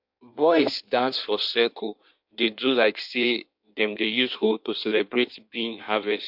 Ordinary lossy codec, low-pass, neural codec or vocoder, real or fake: MP3, 48 kbps; 5.4 kHz; codec, 16 kHz in and 24 kHz out, 1.1 kbps, FireRedTTS-2 codec; fake